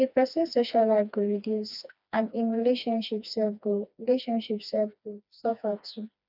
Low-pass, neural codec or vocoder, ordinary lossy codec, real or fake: 5.4 kHz; codec, 16 kHz, 2 kbps, FreqCodec, smaller model; none; fake